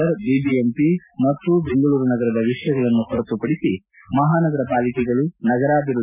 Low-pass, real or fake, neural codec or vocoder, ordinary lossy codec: 3.6 kHz; real; none; none